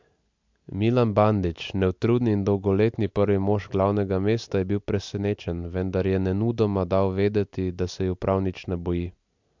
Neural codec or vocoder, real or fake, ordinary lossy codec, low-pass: none; real; MP3, 64 kbps; 7.2 kHz